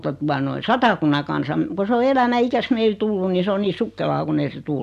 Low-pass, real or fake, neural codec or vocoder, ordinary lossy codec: 14.4 kHz; real; none; none